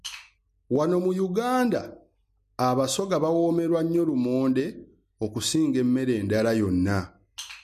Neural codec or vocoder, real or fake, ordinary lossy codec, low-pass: none; real; MP3, 64 kbps; 14.4 kHz